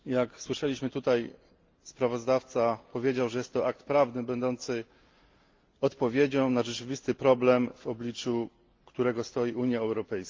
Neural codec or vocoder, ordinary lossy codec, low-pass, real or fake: none; Opus, 32 kbps; 7.2 kHz; real